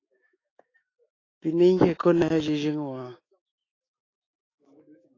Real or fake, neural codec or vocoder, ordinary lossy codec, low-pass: fake; autoencoder, 48 kHz, 128 numbers a frame, DAC-VAE, trained on Japanese speech; AAC, 32 kbps; 7.2 kHz